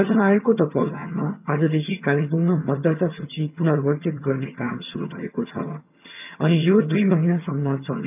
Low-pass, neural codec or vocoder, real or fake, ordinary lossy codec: 3.6 kHz; vocoder, 22.05 kHz, 80 mel bands, HiFi-GAN; fake; none